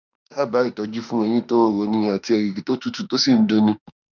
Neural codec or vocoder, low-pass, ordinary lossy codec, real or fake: autoencoder, 48 kHz, 32 numbers a frame, DAC-VAE, trained on Japanese speech; 7.2 kHz; none; fake